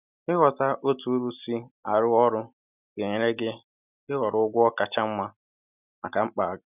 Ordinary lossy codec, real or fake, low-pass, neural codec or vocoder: none; real; 3.6 kHz; none